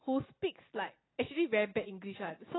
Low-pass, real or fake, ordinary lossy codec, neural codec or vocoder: 7.2 kHz; real; AAC, 16 kbps; none